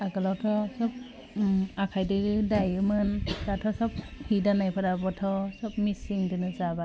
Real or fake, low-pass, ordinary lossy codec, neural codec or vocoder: real; none; none; none